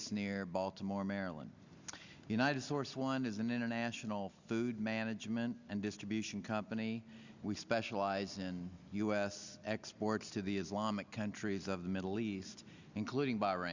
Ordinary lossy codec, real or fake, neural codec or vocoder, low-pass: Opus, 64 kbps; real; none; 7.2 kHz